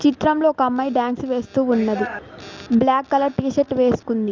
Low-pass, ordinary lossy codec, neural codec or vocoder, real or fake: 7.2 kHz; Opus, 32 kbps; none; real